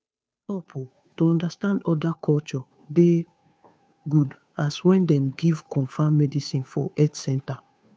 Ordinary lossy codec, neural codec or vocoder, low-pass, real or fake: none; codec, 16 kHz, 2 kbps, FunCodec, trained on Chinese and English, 25 frames a second; none; fake